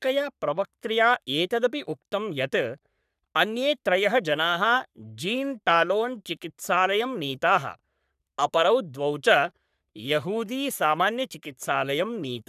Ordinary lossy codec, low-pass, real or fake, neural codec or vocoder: none; 14.4 kHz; fake; codec, 44.1 kHz, 3.4 kbps, Pupu-Codec